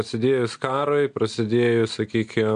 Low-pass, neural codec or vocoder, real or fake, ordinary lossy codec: 9.9 kHz; none; real; MP3, 64 kbps